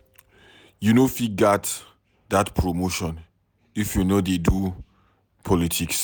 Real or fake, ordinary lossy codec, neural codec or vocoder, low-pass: real; none; none; none